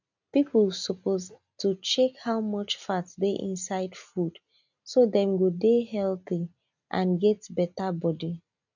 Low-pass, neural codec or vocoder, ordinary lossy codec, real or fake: 7.2 kHz; none; none; real